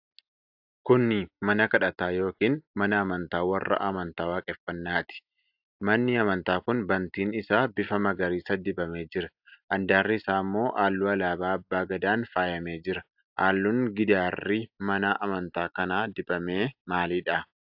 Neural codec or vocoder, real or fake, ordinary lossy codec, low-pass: none; real; AAC, 48 kbps; 5.4 kHz